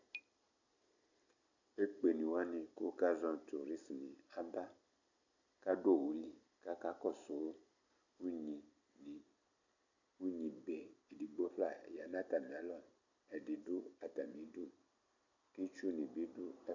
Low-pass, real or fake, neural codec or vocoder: 7.2 kHz; real; none